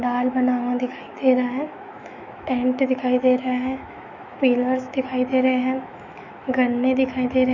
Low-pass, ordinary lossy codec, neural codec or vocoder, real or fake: 7.2 kHz; none; autoencoder, 48 kHz, 128 numbers a frame, DAC-VAE, trained on Japanese speech; fake